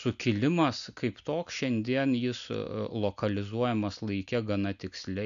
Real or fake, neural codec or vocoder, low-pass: real; none; 7.2 kHz